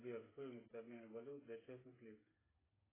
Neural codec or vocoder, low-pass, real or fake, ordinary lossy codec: codec, 16 kHz, 8 kbps, FreqCodec, smaller model; 3.6 kHz; fake; AAC, 16 kbps